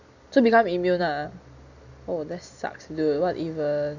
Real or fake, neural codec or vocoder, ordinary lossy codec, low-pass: real; none; Opus, 64 kbps; 7.2 kHz